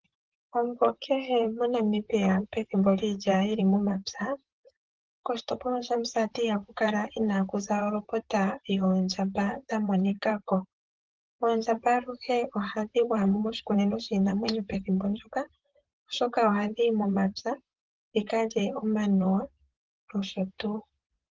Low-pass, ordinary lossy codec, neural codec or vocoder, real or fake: 7.2 kHz; Opus, 16 kbps; vocoder, 44.1 kHz, 128 mel bands, Pupu-Vocoder; fake